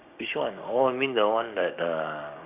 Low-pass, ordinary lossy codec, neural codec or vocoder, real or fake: 3.6 kHz; none; codec, 16 kHz, 6 kbps, DAC; fake